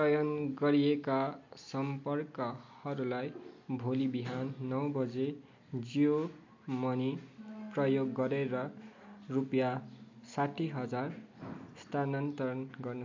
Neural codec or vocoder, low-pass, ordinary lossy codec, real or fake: none; 7.2 kHz; MP3, 48 kbps; real